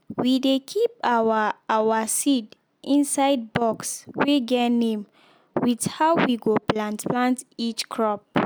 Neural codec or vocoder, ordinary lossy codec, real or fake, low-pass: none; none; real; none